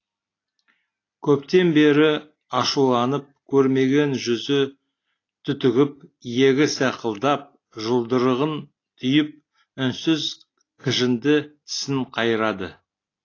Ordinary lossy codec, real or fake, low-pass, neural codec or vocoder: AAC, 32 kbps; real; 7.2 kHz; none